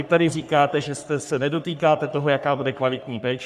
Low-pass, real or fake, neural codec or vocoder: 14.4 kHz; fake; codec, 44.1 kHz, 3.4 kbps, Pupu-Codec